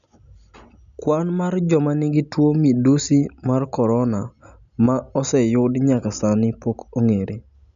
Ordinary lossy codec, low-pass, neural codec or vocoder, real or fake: none; 7.2 kHz; none; real